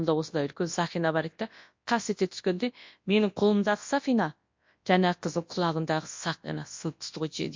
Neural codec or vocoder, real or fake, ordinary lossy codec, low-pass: codec, 24 kHz, 0.9 kbps, WavTokenizer, large speech release; fake; MP3, 48 kbps; 7.2 kHz